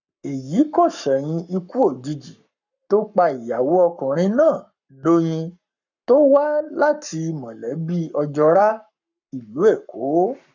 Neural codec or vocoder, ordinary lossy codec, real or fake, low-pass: codec, 44.1 kHz, 7.8 kbps, Pupu-Codec; none; fake; 7.2 kHz